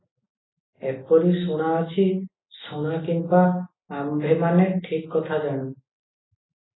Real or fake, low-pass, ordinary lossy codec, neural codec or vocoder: real; 7.2 kHz; AAC, 16 kbps; none